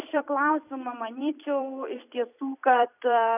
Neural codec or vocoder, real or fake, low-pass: vocoder, 44.1 kHz, 128 mel bands every 256 samples, BigVGAN v2; fake; 3.6 kHz